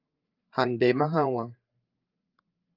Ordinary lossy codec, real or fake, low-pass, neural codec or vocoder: Opus, 24 kbps; fake; 5.4 kHz; codec, 16 kHz, 8 kbps, FreqCodec, larger model